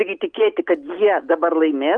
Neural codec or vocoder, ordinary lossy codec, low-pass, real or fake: none; Opus, 32 kbps; 9.9 kHz; real